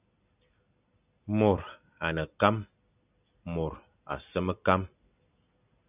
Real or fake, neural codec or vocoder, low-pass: real; none; 3.6 kHz